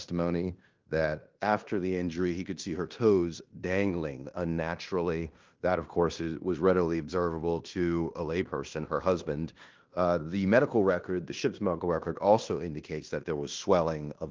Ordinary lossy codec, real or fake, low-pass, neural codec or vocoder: Opus, 32 kbps; fake; 7.2 kHz; codec, 16 kHz in and 24 kHz out, 0.9 kbps, LongCat-Audio-Codec, fine tuned four codebook decoder